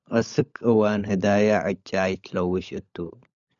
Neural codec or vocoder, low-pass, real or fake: codec, 16 kHz, 16 kbps, FunCodec, trained on LibriTTS, 50 frames a second; 7.2 kHz; fake